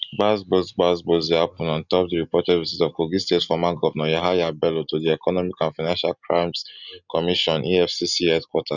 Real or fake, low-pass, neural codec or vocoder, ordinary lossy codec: real; 7.2 kHz; none; none